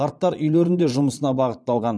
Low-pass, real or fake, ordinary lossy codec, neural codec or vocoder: none; fake; none; vocoder, 22.05 kHz, 80 mel bands, Vocos